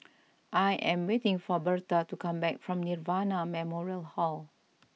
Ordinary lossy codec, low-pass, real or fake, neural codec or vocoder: none; none; real; none